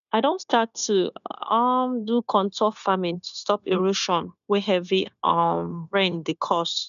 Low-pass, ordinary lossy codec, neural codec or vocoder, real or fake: 7.2 kHz; none; codec, 16 kHz, 0.9 kbps, LongCat-Audio-Codec; fake